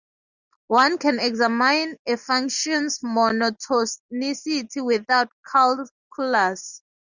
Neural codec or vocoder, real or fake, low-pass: none; real; 7.2 kHz